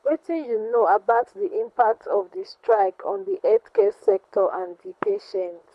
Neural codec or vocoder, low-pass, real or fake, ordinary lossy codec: codec, 24 kHz, 6 kbps, HILCodec; none; fake; none